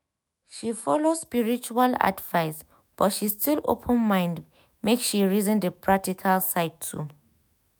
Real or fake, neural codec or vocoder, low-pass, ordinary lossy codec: fake; autoencoder, 48 kHz, 128 numbers a frame, DAC-VAE, trained on Japanese speech; none; none